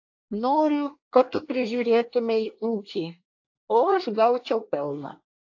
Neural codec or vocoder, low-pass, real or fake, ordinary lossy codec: codec, 24 kHz, 1 kbps, SNAC; 7.2 kHz; fake; AAC, 48 kbps